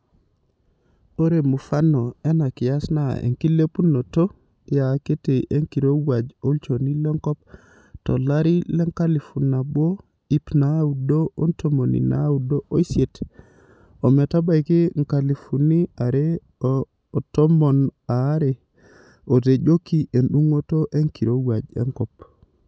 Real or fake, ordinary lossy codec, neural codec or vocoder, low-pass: real; none; none; none